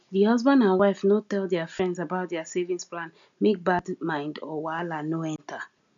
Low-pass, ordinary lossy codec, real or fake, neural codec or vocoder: 7.2 kHz; none; real; none